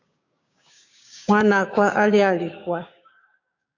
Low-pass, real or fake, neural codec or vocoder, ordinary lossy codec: 7.2 kHz; fake; codec, 16 kHz, 6 kbps, DAC; AAC, 48 kbps